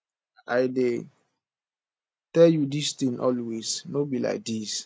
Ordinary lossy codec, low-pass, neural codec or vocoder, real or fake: none; none; none; real